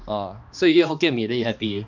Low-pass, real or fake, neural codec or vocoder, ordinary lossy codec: 7.2 kHz; fake; codec, 16 kHz, 2 kbps, X-Codec, HuBERT features, trained on balanced general audio; none